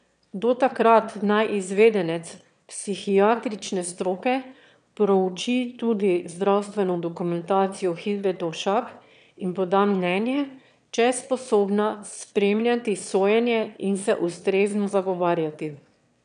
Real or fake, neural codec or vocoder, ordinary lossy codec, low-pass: fake; autoencoder, 22.05 kHz, a latent of 192 numbers a frame, VITS, trained on one speaker; none; 9.9 kHz